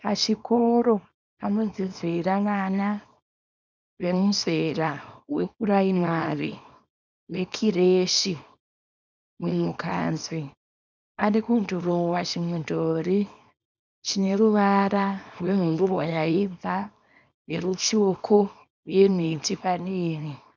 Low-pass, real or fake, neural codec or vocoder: 7.2 kHz; fake; codec, 24 kHz, 0.9 kbps, WavTokenizer, small release